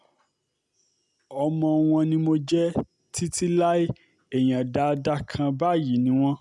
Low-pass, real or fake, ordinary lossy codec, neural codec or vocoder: none; real; none; none